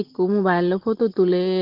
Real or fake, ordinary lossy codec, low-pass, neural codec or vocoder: real; Opus, 16 kbps; 5.4 kHz; none